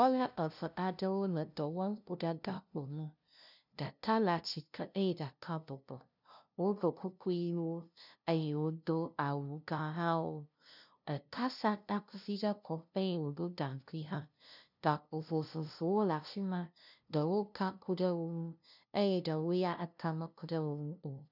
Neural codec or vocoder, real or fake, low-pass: codec, 16 kHz, 0.5 kbps, FunCodec, trained on LibriTTS, 25 frames a second; fake; 5.4 kHz